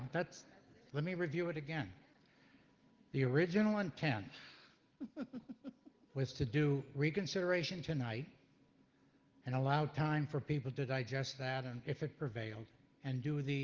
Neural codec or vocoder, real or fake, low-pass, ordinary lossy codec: none; real; 7.2 kHz; Opus, 16 kbps